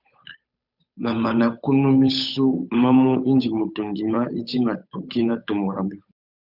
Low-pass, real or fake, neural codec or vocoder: 5.4 kHz; fake; codec, 16 kHz, 8 kbps, FunCodec, trained on Chinese and English, 25 frames a second